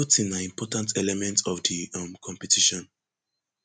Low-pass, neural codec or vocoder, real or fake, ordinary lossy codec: none; none; real; none